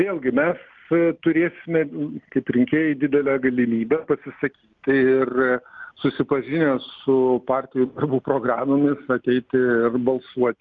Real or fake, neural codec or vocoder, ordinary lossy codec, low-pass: real; none; Opus, 32 kbps; 7.2 kHz